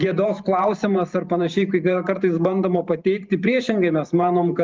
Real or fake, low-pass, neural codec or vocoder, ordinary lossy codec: fake; 7.2 kHz; vocoder, 44.1 kHz, 128 mel bands every 512 samples, BigVGAN v2; Opus, 24 kbps